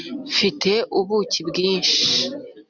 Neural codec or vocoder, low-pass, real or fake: none; 7.2 kHz; real